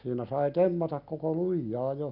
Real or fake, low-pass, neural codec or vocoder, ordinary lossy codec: real; 5.4 kHz; none; none